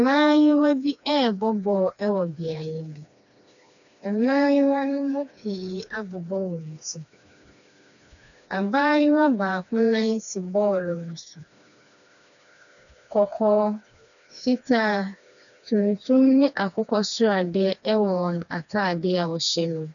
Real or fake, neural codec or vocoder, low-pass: fake; codec, 16 kHz, 2 kbps, FreqCodec, smaller model; 7.2 kHz